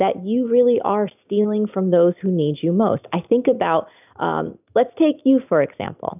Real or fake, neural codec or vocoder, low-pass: real; none; 3.6 kHz